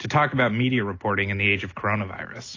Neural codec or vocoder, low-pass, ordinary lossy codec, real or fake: none; 7.2 kHz; AAC, 32 kbps; real